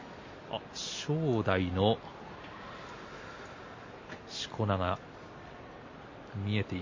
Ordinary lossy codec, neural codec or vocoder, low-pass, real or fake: MP3, 32 kbps; none; 7.2 kHz; real